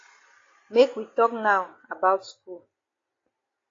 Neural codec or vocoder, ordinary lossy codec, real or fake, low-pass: none; AAC, 32 kbps; real; 7.2 kHz